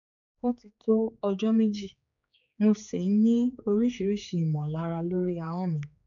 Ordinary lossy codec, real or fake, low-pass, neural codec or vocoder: none; fake; 7.2 kHz; codec, 16 kHz, 4 kbps, X-Codec, HuBERT features, trained on general audio